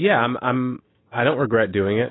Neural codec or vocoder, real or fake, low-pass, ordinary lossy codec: none; real; 7.2 kHz; AAC, 16 kbps